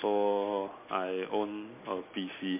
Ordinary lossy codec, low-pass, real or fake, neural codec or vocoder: MP3, 32 kbps; 3.6 kHz; real; none